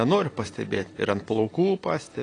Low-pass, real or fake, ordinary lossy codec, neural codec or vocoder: 9.9 kHz; real; AAC, 32 kbps; none